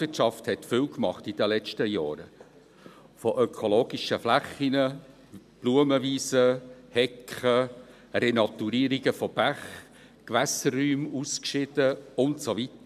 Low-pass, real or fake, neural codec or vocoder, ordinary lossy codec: 14.4 kHz; real; none; none